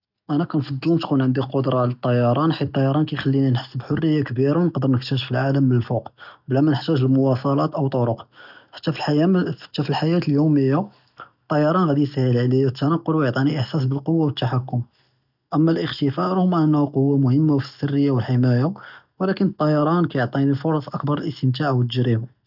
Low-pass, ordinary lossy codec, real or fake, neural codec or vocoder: 5.4 kHz; none; real; none